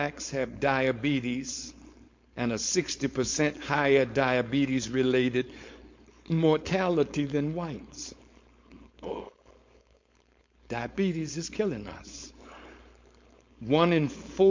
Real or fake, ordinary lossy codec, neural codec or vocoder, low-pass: fake; MP3, 48 kbps; codec, 16 kHz, 4.8 kbps, FACodec; 7.2 kHz